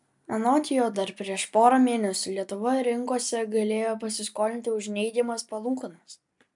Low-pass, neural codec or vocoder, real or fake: 10.8 kHz; none; real